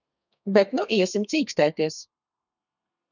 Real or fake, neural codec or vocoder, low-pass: fake; codec, 32 kHz, 1.9 kbps, SNAC; 7.2 kHz